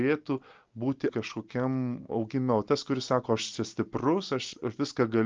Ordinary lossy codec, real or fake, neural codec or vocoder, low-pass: Opus, 24 kbps; real; none; 7.2 kHz